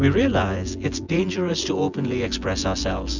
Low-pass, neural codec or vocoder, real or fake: 7.2 kHz; vocoder, 24 kHz, 100 mel bands, Vocos; fake